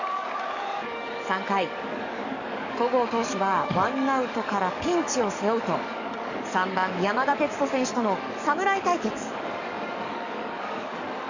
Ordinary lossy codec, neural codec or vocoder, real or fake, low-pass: none; codec, 44.1 kHz, 7.8 kbps, DAC; fake; 7.2 kHz